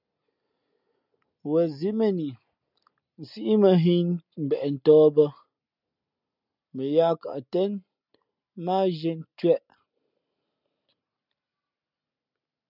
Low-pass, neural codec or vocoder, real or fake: 5.4 kHz; none; real